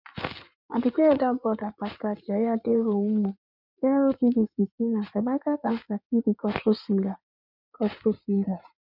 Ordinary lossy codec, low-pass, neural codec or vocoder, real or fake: none; 5.4 kHz; codec, 16 kHz in and 24 kHz out, 2.2 kbps, FireRedTTS-2 codec; fake